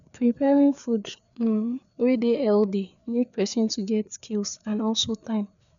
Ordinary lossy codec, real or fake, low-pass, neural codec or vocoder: none; fake; 7.2 kHz; codec, 16 kHz, 4 kbps, FreqCodec, larger model